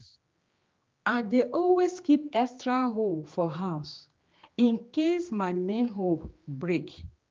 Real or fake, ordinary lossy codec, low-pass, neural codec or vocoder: fake; Opus, 24 kbps; 7.2 kHz; codec, 16 kHz, 2 kbps, X-Codec, HuBERT features, trained on general audio